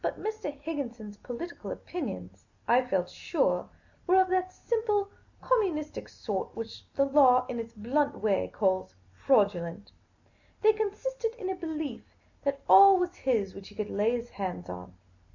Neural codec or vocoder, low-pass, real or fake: none; 7.2 kHz; real